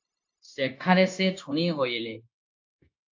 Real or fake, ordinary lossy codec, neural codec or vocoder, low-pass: fake; AAC, 48 kbps; codec, 16 kHz, 0.9 kbps, LongCat-Audio-Codec; 7.2 kHz